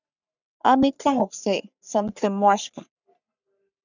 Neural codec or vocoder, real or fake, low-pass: codec, 44.1 kHz, 3.4 kbps, Pupu-Codec; fake; 7.2 kHz